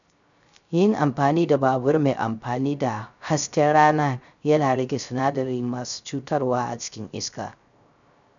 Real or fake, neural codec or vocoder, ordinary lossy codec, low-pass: fake; codec, 16 kHz, 0.3 kbps, FocalCodec; none; 7.2 kHz